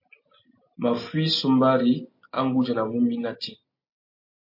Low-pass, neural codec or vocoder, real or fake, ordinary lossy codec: 5.4 kHz; none; real; MP3, 32 kbps